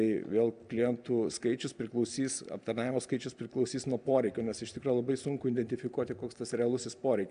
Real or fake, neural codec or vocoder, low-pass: real; none; 9.9 kHz